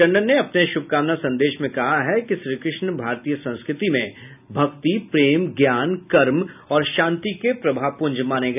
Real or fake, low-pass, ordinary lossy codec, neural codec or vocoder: real; 3.6 kHz; none; none